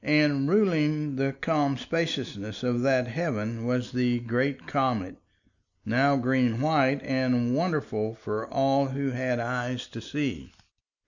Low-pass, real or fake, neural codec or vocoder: 7.2 kHz; real; none